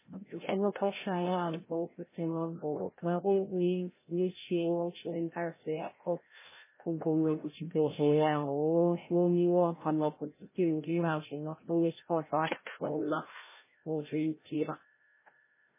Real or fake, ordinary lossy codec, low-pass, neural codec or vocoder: fake; MP3, 16 kbps; 3.6 kHz; codec, 16 kHz, 0.5 kbps, FreqCodec, larger model